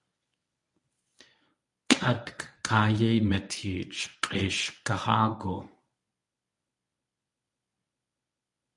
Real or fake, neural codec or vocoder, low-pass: fake; codec, 24 kHz, 0.9 kbps, WavTokenizer, medium speech release version 1; 10.8 kHz